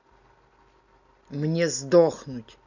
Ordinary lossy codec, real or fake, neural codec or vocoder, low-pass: none; real; none; 7.2 kHz